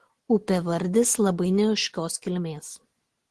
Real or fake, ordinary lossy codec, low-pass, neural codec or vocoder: real; Opus, 16 kbps; 10.8 kHz; none